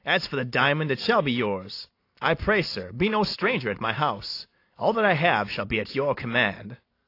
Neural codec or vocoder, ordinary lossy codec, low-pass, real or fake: none; AAC, 32 kbps; 5.4 kHz; real